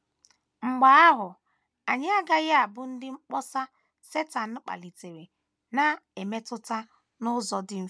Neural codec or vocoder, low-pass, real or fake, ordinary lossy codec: none; none; real; none